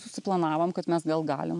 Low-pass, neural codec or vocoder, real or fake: 10.8 kHz; none; real